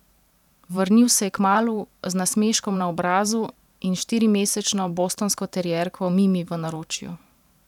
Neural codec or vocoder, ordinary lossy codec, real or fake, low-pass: vocoder, 44.1 kHz, 128 mel bands every 512 samples, BigVGAN v2; none; fake; 19.8 kHz